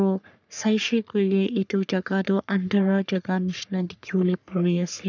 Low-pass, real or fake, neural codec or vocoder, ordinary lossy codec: 7.2 kHz; fake; codec, 44.1 kHz, 3.4 kbps, Pupu-Codec; none